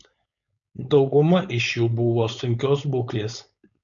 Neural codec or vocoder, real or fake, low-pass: codec, 16 kHz, 4.8 kbps, FACodec; fake; 7.2 kHz